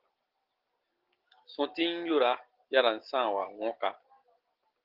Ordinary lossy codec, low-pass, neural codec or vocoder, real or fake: Opus, 16 kbps; 5.4 kHz; none; real